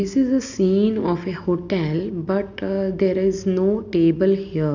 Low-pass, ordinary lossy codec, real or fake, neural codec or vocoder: 7.2 kHz; none; real; none